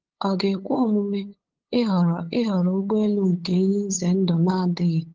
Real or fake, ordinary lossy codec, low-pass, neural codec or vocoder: fake; Opus, 16 kbps; 7.2 kHz; codec, 16 kHz, 8 kbps, FunCodec, trained on LibriTTS, 25 frames a second